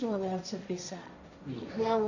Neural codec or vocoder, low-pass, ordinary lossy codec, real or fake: codec, 16 kHz, 1.1 kbps, Voila-Tokenizer; 7.2 kHz; none; fake